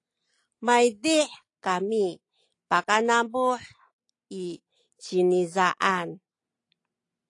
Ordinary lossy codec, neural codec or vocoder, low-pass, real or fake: AAC, 48 kbps; none; 10.8 kHz; real